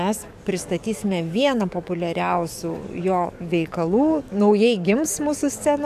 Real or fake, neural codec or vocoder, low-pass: fake; codec, 44.1 kHz, 7.8 kbps, DAC; 14.4 kHz